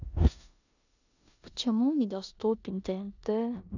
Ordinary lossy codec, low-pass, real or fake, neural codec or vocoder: none; 7.2 kHz; fake; codec, 16 kHz in and 24 kHz out, 0.9 kbps, LongCat-Audio-Codec, four codebook decoder